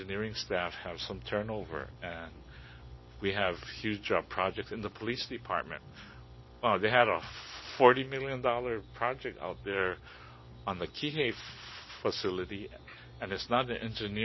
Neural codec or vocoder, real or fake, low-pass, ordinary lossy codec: none; real; 7.2 kHz; MP3, 24 kbps